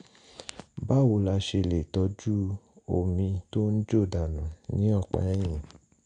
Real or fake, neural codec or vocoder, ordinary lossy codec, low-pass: real; none; none; 9.9 kHz